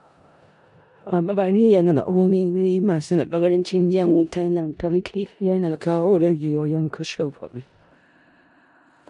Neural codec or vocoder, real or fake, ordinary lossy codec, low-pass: codec, 16 kHz in and 24 kHz out, 0.4 kbps, LongCat-Audio-Codec, four codebook decoder; fake; none; 10.8 kHz